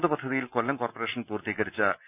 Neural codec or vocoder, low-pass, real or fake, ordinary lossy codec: none; 3.6 kHz; real; none